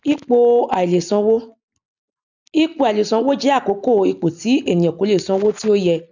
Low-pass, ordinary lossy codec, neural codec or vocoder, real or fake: 7.2 kHz; none; none; real